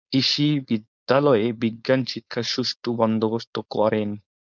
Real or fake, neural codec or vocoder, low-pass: fake; codec, 16 kHz, 4.8 kbps, FACodec; 7.2 kHz